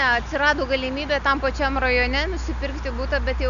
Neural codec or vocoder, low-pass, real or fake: none; 7.2 kHz; real